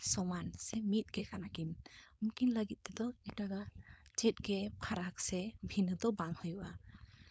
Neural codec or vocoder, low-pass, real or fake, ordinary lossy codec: codec, 16 kHz, 4.8 kbps, FACodec; none; fake; none